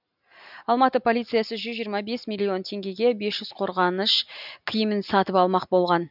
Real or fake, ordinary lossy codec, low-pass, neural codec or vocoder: real; none; 5.4 kHz; none